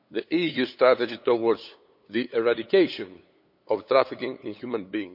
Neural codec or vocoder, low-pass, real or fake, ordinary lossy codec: codec, 16 kHz, 8 kbps, FunCodec, trained on LibriTTS, 25 frames a second; 5.4 kHz; fake; none